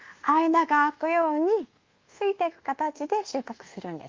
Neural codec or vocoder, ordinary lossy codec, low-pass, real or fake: codec, 24 kHz, 1.2 kbps, DualCodec; Opus, 32 kbps; 7.2 kHz; fake